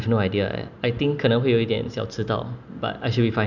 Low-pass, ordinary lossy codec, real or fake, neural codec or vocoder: 7.2 kHz; none; real; none